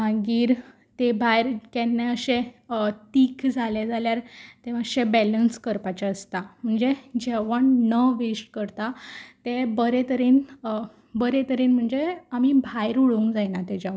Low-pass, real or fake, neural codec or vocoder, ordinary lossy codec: none; real; none; none